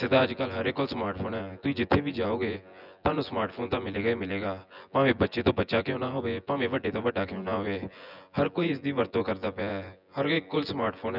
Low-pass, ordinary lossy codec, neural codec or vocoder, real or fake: 5.4 kHz; none; vocoder, 24 kHz, 100 mel bands, Vocos; fake